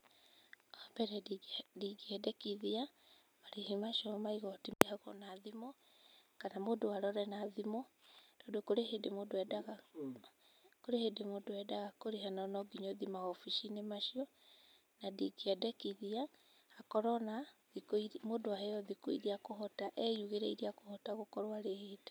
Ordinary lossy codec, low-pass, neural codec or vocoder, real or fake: none; none; none; real